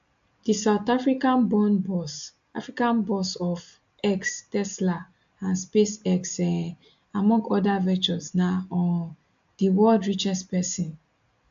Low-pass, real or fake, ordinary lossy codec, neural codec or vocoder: 7.2 kHz; real; AAC, 64 kbps; none